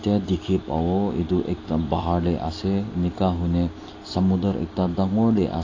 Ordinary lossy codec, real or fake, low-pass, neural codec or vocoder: AAC, 32 kbps; real; 7.2 kHz; none